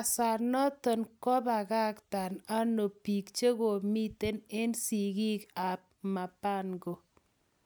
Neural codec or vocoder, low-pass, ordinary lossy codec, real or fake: none; none; none; real